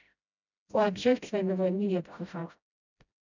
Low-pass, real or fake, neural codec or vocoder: 7.2 kHz; fake; codec, 16 kHz, 0.5 kbps, FreqCodec, smaller model